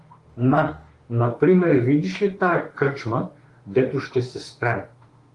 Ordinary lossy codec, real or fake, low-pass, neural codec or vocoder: Opus, 24 kbps; fake; 10.8 kHz; autoencoder, 48 kHz, 32 numbers a frame, DAC-VAE, trained on Japanese speech